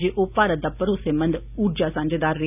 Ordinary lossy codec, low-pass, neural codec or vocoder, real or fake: none; 3.6 kHz; none; real